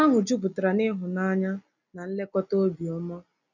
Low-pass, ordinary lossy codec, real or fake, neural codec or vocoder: 7.2 kHz; none; real; none